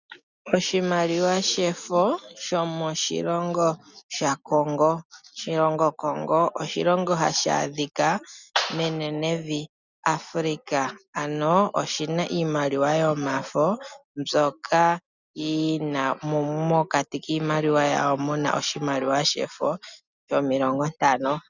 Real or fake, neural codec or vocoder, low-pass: real; none; 7.2 kHz